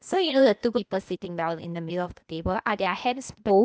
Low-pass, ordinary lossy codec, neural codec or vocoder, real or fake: none; none; codec, 16 kHz, 0.8 kbps, ZipCodec; fake